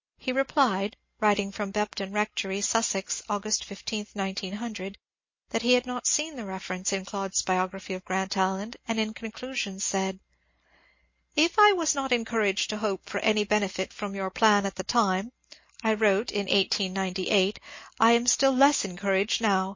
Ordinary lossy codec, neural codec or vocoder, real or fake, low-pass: MP3, 32 kbps; none; real; 7.2 kHz